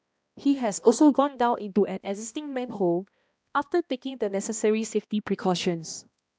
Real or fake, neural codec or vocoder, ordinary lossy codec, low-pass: fake; codec, 16 kHz, 1 kbps, X-Codec, HuBERT features, trained on balanced general audio; none; none